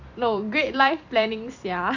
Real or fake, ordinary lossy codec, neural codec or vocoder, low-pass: fake; none; vocoder, 44.1 kHz, 128 mel bands every 256 samples, BigVGAN v2; 7.2 kHz